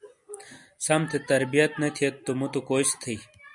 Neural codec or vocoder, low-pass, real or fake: none; 10.8 kHz; real